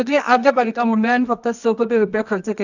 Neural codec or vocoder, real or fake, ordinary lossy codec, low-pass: codec, 24 kHz, 0.9 kbps, WavTokenizer, medium music audio release; fake; none; 7.2 kHz